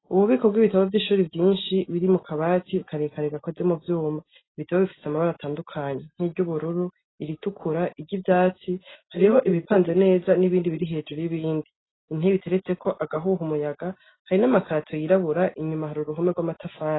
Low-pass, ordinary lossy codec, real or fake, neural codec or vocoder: 7.2 kHz; AAC, 16 kbps; real; none